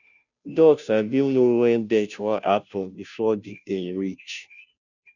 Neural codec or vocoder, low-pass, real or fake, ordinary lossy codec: codec, 16 kHz, 0.5 kbps, FunCodec, trained on Chinese and English, 25 frames a second; 7.2 kHz; fake; none